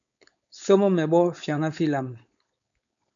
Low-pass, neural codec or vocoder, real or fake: 7.2 kHz; codec, 16 kHz, 4.8 kbps, FACodec; fake